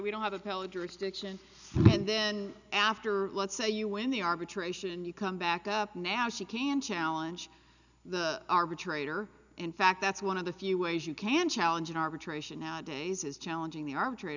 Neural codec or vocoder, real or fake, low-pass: none; real; 7.2 kHz